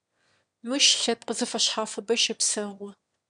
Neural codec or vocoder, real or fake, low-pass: autoencoder, 22.05 kHz, a latent of 192 numbers a frame, VITS, trained on one speaker; fake; 9.9 kHz